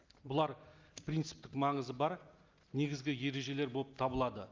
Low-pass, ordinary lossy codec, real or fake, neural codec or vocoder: 7.2 kHz; Opus, 24 kbps; real; none